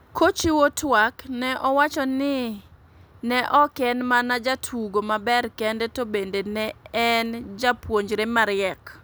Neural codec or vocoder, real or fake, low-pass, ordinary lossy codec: none; real; none; none